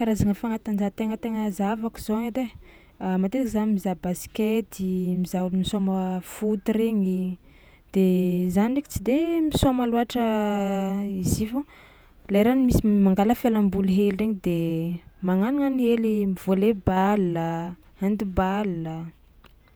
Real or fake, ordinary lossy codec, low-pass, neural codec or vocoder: fake; none; none; vocoder, 48 kHz, 128 mel bands, Vocos